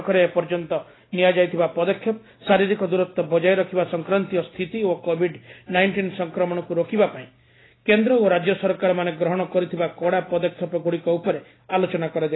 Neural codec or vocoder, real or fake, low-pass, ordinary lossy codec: none; real; 7.2 kHz; AAC, 16 kbps